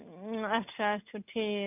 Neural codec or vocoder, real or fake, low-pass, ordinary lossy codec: none; real; 3.6 kHz; none